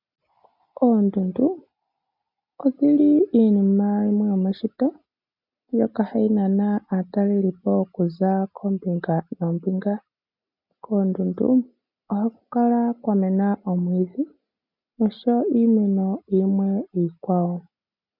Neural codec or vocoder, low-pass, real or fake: none; 5.4 kHz; real